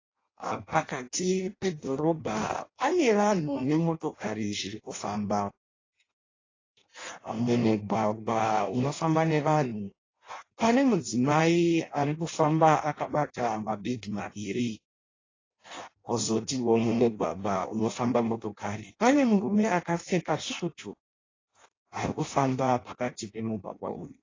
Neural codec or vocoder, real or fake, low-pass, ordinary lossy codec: codec, 16 kHz in and 24 kHz out, 0.6 kbps, FireRedTTS-2 codec; fake; 7.2 kHz; AAC, 32 kbps